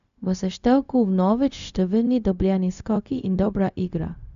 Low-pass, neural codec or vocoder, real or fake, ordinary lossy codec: 7.2 kHz; codec, 16 kHz, 0.4 kbps, LongCat-Audio-Codec; fake; none